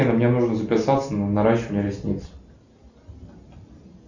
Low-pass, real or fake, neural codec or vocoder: 7.2 kHz; real; none